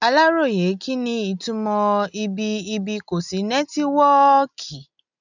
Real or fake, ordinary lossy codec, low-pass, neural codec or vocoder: real; none; 7.2 kHz; none